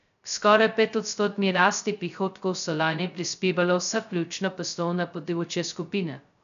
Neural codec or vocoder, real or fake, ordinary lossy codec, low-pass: codec, 16 kHz, 0.2 kbps, FocalCodec; fake; none; 7.2 kHz